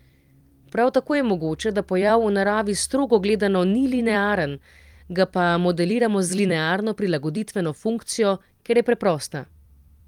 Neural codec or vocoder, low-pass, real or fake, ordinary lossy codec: vocoder, 44.1 kHz, 128 mel bands every 256 samples, BigVGAN v2; 19.8 kHz; fake; Opus, 32 kbps